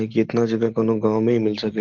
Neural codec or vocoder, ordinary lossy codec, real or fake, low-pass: none; Opus, 16 kbps; real; 7.2 kHz